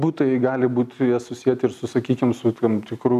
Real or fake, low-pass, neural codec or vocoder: real; 14.4 kHz; none